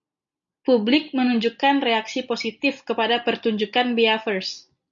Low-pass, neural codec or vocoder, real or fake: 7.2 kHz; none; real